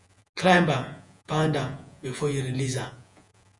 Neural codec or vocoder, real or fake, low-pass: vocoder, 48 kHz, 128 mel bands, Vocos; fake; 10.8 kHz